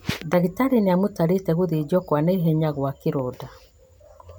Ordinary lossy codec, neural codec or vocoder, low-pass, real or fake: none; none; none; real